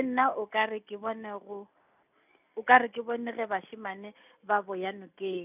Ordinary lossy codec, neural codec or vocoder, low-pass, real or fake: none; none; 3.6 kHz; real